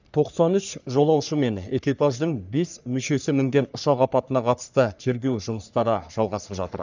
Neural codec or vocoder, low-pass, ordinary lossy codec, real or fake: codec, 44.1 kHz, 3.4 kbps, Pupu-Codec; 7.2 kHz; none; fake